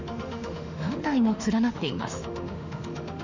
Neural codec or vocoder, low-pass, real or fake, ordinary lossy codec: autoencoder, 48 kHz, 32 numbers a frame, DAC-VAE, trained on Japanese speech; 7.2 kHz; fake; none